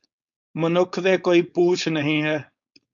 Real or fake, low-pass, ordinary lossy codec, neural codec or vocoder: fake; 7.2 kHz; MP3, 64 kbps; codec, 16 kHz, 4.8 kbps, FACodec